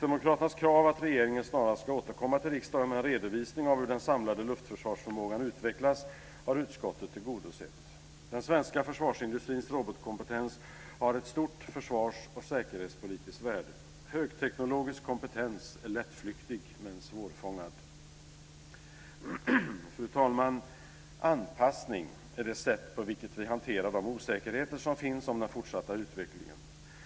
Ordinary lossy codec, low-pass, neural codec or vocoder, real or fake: none; none; none; real